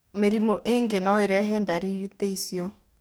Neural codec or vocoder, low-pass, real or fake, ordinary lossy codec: codec, 44.1 kHz, 2.6 kbps, DAC; none; fake; none